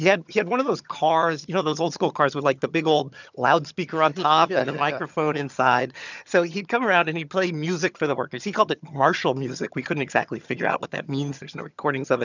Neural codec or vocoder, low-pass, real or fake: vocoder, 22.05 kHz, 80 mel bands, HiFi-GAN; 7.2 kHz; fake